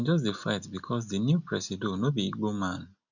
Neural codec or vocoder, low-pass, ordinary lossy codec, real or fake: none; 7.2 kHz; none; real